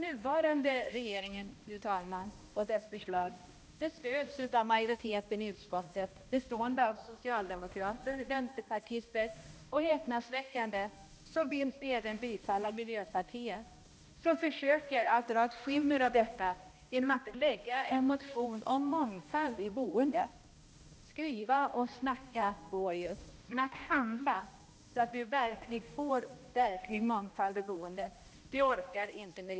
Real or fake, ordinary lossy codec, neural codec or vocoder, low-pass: fake; none; codec, 16 kHz, 1 kbps, X-Codec, HuBERT features, trained on balanced general audio; none